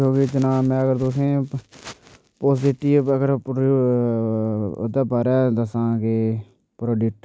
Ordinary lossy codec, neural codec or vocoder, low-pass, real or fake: none; none; none; real